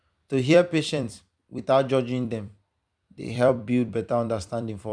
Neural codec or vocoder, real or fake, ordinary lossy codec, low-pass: vocoder, 44.1 kHz, 128 mel bands every 256 samples, BigVGAN v2; fake; none; 9.9 kHz